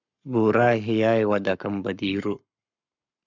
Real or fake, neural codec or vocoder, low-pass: fake; codec, 44.1 kHz, 7.8 kbps, Pupu-Codec; 7.2 kHz